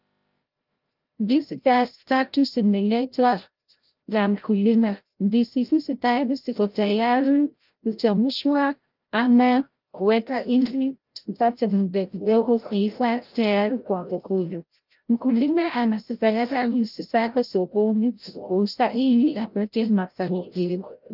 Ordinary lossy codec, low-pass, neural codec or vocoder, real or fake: Opus, 32 kbps; 5.4 kHz; codec, 16 kHz, 0.5 kbps, FreqCodec, larger model; fake